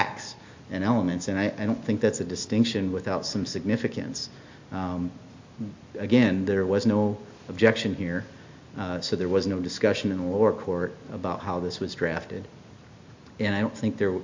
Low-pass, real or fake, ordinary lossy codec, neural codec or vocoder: 7.2 kHz; real; MP3, 48 kbps; none